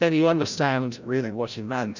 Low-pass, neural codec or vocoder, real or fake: 7.2 kHz; codec, 16 kHz, 0.5 kbps, FreqCodec, larger model; fake